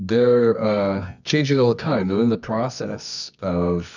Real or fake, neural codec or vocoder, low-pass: fake; codec, 24 kHz, 0.9 kbps, WavTokenizer, medium music audio release; 7.2 kHz